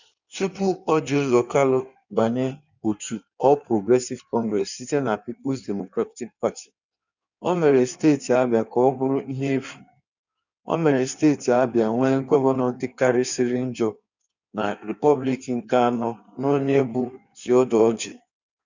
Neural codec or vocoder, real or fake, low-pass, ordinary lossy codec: codec, 16 kHz in and 24 kHz out, 1.1 kbps, FireRedTTS-2 codec; fake; 7.2 kHz; none